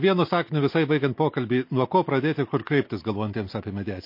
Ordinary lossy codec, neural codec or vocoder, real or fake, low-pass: MP3, 32 kbps; none; real; 5.4 kHz